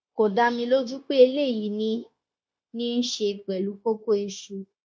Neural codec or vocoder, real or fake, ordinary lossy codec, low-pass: codec, 16 kHz, 0.9 kbps, LongCat-Audio-Codec; fake; none; none